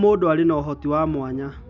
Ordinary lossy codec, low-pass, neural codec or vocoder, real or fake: none; 7.2 kHz; none; real